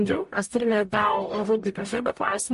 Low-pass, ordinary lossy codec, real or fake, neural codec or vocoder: 14.4 kHz; MP3, 48 kbps; fake; codec, 44.1 kHz, 0.9 kbps, DAC